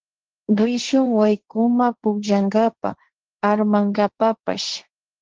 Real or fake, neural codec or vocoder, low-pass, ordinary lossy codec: fake; codec, 16 kHz, 1.1 kbps, Voila-Tokenizer; 7.2 kHz; Opus, 16 kbps